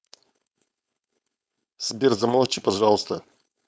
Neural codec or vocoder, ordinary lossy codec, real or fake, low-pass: codec, 16 kHz, 4.8 kbps, FACodec; none; fake; none